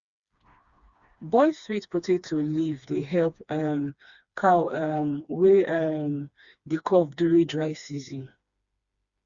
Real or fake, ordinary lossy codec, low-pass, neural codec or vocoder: fake; Opus, 64 kbps; 7.2 kHz; codec, 16 kHz, 2 kbps, FreqCodec, smaller model